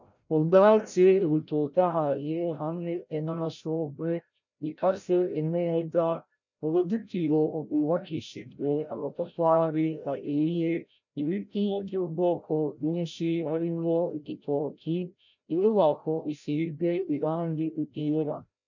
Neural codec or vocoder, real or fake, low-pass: codec, 16 kHz, 0.5 kbps, FreqCodec, larger model; fake; 7.2 kHz